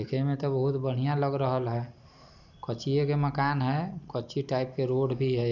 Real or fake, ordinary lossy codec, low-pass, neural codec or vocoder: real; none; 7.2 kHz; none